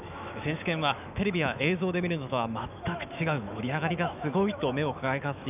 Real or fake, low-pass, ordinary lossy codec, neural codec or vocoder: fake; 3.6 kHz; none; autoencoder, 48 kHz, 128 numbers a frame, DAC-VAE, trained on Japanese speech